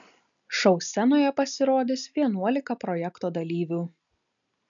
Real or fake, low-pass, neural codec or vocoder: real; 7.2 kHz; none